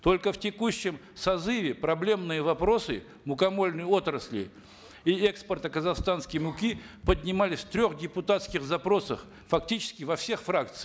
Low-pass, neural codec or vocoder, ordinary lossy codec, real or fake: none; none; none; real